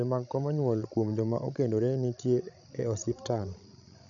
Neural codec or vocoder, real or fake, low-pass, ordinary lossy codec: codec, 16 kHz, 16 kbps, FreqCodec, larger model; fake; 7.2 kHz; none